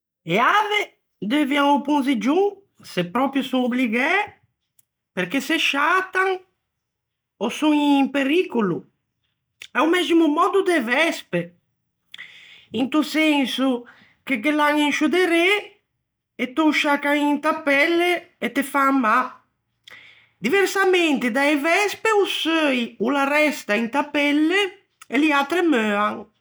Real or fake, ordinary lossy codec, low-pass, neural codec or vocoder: real; none; none; none